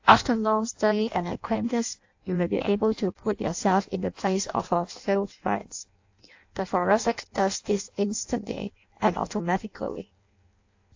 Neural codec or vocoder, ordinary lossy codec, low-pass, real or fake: codec, 16 kHz in and 24 kHz out, 0.6 kbps, FireRedTTS-2 codec; AAC, 48 kbps; 7.2 kHz; fake